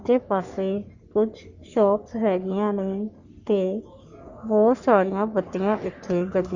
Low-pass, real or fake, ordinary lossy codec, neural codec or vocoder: 7.2 kHz; fake; Opus, 64 kbps; codec, 44.1 kHz, 3.4 kbps, Pupu-Codec